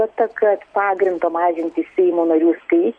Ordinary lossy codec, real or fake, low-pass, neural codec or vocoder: MP3, 96 kbps; real; 9.9 kHz; none